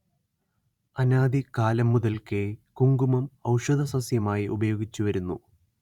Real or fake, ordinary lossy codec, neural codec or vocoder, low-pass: fake; Opus, 64 kbps; vocoder, 44.1 kHz, 128 mel bands every 512 samples, BigVGAN v2; 19.8 kHz